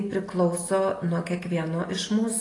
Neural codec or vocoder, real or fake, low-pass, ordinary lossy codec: none; real; 10.8 kHz; AAC, 32 kbps